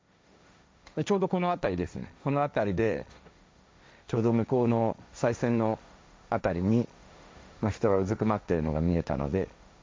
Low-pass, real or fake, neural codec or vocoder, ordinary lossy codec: none; fake; codec, 16 kHz, 1.1 kbps, Voila-Tokenizer; none